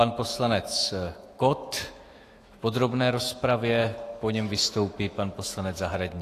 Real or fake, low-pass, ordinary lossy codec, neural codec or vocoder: fake; 14.4 kHz; AAC, 48 kbps; autoencoder, 48 kHz, 128 numbers a frame, DAC-VAE, trained on Japanese speech